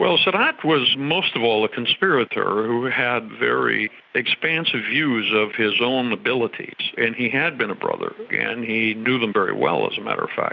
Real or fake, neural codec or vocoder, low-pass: real; none; 7.2 kHz